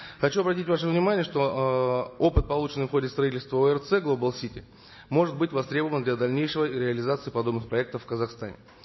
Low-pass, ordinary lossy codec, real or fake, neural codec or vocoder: 7.2 kHz; MP3, 24 kbps; real; none